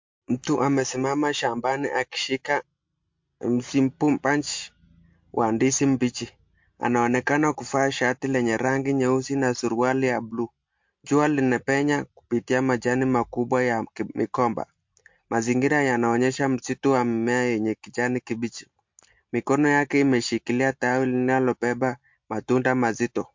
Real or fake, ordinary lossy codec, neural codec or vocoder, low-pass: real; MP3, 48 kbps; none; 7.2 kHz